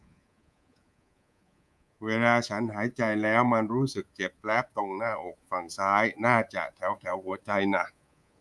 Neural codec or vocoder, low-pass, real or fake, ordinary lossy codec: codec, 24 kHz, 3.1 kbps, DualCodec; none; fake; none